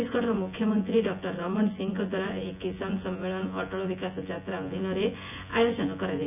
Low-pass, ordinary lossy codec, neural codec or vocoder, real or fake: 3.6 kHz; none; vocoder, 24 kHz, 100 mel bands, Vocos; fake